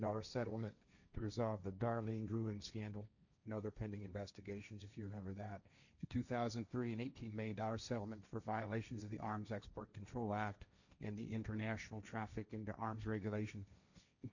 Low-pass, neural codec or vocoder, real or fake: 7.2 kHz; codec, 16 kHz, 1.1 kbps, Voila-Tokenizer; fake